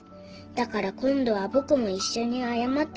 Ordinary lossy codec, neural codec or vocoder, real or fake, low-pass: Opus, 16 kbps; none; real; 7.2 kHz